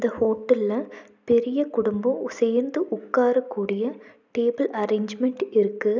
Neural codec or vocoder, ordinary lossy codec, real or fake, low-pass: none; none; real; 7.2 kHz